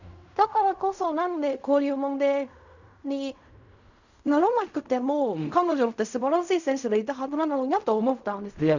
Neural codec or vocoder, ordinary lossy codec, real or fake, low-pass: codec, 16 kHz in and 24 kHz out, 0.4 kbps, LongCat-Audio-Codec, fine tuned four codebook decoder; none; fake; 7.2 kHz